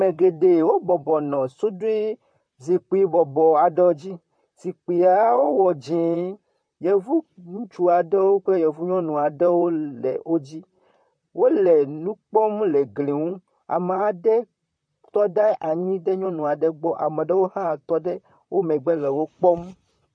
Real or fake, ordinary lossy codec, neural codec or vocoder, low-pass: fake; MP3, 48 kbps; vocoder, 44.1 kHz, 128 mel bands, Pupu-Vocoder; 9.9 kHz